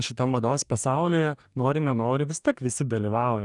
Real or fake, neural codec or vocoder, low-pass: fake; codec, 44.1 kHz, 2.6 kbps, DAC; 10.8 kHz